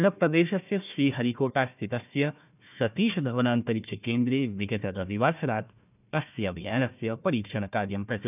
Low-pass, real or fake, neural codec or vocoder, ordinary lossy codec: 3.6 kHz; fake; codec, 16 kHz, 1 kbps, FunCodec, trained on Chinese and English, 50 frames a second; none